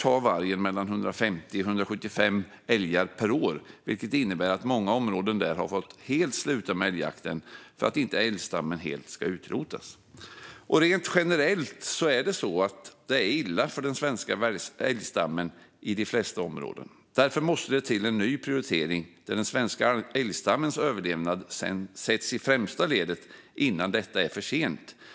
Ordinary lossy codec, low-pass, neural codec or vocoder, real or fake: none; none; none; real